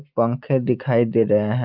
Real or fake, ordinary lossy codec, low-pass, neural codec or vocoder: real; Opus, 24 kbps; 5.4 kHz; none